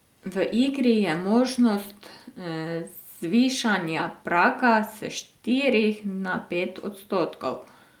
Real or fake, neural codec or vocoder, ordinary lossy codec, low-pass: real; none; Opus, 32 kbps; 19.8 kHz